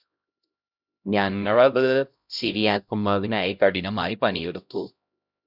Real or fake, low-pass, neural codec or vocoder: fake; 5.4 kHz; codec, 16 kHz, 0.5 kbps, X-Codec, HuBERT features, trained on LibriSpeech